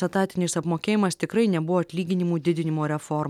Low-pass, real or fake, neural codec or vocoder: 19.8 kHz; real; none